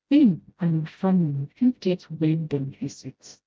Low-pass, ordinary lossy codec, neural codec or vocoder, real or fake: none; none; codec, 16 kHz, 0.5 kbps, FreqCodec, smaller model; fake